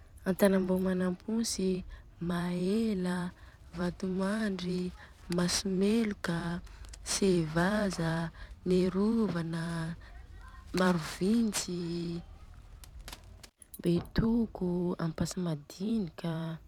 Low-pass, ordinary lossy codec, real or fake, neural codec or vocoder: 19.8 kHz; none; fake; vocoder, 44.1 kHz, 128 mel bands every 512 samples, BigVGAN v2